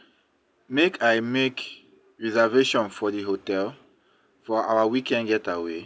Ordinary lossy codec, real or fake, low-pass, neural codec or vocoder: none; real; none; none